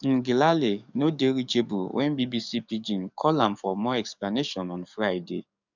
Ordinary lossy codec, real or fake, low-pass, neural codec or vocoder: none; fake; 7.2 kHz; codec, 44.1 kHz, 7.8 kbps, DAC